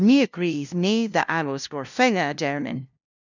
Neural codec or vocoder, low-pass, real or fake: codec, 16 kHz, 0.5 kbps, FunCodec, trained on LibriTTS, 25 frames a second; 7.2 kHz; fake